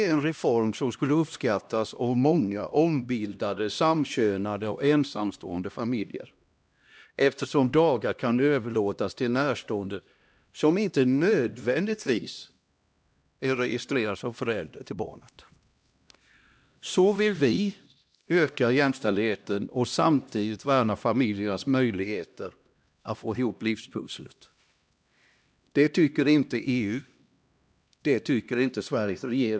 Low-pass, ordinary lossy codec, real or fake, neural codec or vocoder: none; none; fake; codec, 16 kHz, 1 kbps, X-Codec, HuBERT features, trained on LibriSpeech